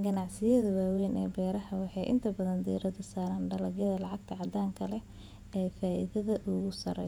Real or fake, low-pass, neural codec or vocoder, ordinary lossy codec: fake; 19.8 kHz; vocoder, 48 kHz, 128 mel bands, Vocos; none